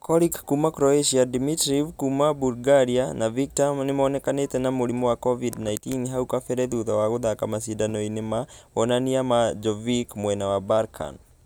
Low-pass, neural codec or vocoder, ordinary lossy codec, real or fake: none; none; none; real